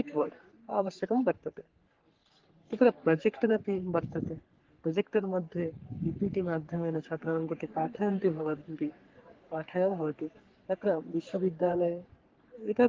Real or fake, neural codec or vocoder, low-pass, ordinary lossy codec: fake; codec, 44.1 kHz, 3.4 kbps, Pupu-Codec; 7.2 kHz; Opus, 16 kbps